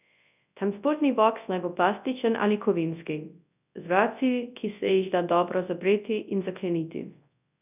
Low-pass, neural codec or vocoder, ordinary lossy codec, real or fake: 3.6 kHz; codec, 24 kHz, 0.9 kbps, WavTokenizer, large speech release; none; fake